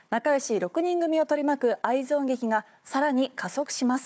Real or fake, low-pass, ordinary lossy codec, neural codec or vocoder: fake; none; none; codec, 16 kHz, 4 kbps, FunCodec, trained on Chinese and English, 50 frames a second